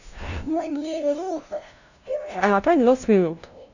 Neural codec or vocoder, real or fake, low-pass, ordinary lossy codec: codec, 16 kHz, 0.5 kbps, FunCodec, trained on LibriTTS, 25 frames a second; fake; 7.2 kHz; none